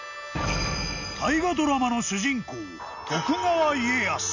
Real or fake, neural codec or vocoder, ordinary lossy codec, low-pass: real; none; none; 7.2 kHz